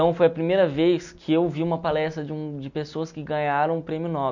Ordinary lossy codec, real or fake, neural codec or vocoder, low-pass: none; real; none; 7.2 kHz